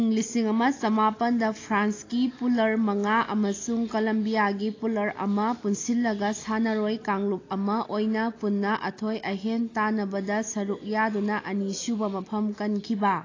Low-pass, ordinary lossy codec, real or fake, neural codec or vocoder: 7.2 kHz; AAC, 32 kbps; real; none